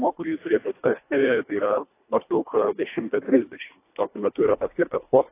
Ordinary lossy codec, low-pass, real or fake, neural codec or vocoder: AAC, 24 kbps; 3.6 kHz; fake; codec, 24 kHz, 1.5 kbps, HILCodec